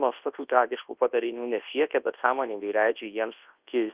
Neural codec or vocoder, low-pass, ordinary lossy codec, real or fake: codec, 24 kHz, 0.9 kbps, WavTokenizer, large speech release; 3.6 kHz; Opus, 32 kbps; fake